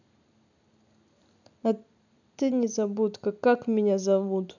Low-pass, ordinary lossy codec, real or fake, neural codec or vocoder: 7.2 kHz; none; real; none